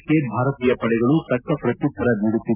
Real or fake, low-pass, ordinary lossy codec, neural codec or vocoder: real; 3.6 kHz; none; none